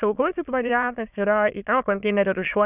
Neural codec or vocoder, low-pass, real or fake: autoencoder, 22.05 kHz, a latent of 192 numbers a frame, VITS, trained on many speakers; 3.6 kHz; fake